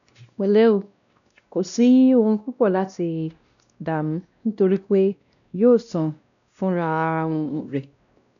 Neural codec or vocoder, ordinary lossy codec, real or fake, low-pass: codec, 16 kHz, 1 kbps, X-Codec, WavLM features, trained on Multilingual LibriSpeech; none; fake; 7.2 kHz